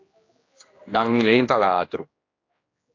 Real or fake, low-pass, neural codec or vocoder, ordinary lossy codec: fake; 7.2 kHz; codec, 16 kHz, 1 kbps, X-Codec, HuBERT features, trained on general audio; AAC, 32 kbps